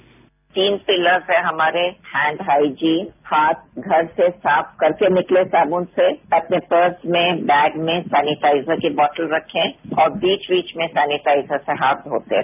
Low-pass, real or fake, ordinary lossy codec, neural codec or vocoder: 3.6 kHz; real; none; none